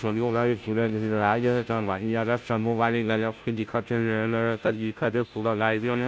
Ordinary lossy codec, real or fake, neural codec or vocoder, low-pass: none; fake; codec, 16 kHz, 0.5 kbps, FunCodec, trained on Chinese and English, 25 frames a second; none